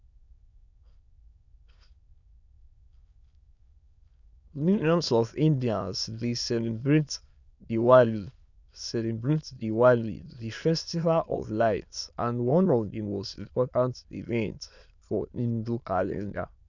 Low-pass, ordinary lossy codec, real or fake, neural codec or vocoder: 7.2 kHz; none; fake; autoencoder, 22.05 kHz, a latent of 192 numbers a frame, VITS, trained on many speakers